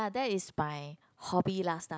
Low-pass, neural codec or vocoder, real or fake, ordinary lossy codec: none; none; real; none